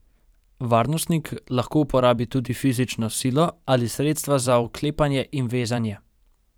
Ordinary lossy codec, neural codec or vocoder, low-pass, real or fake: none; vocoder, 44.1 kHz, 128 mel bands every 512 samples, BigVGAN v2; none; fake